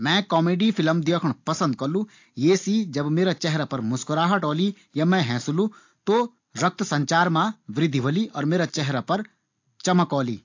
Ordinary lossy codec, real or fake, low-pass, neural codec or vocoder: AAC, 48 kbps; real; 7.2 kHz; none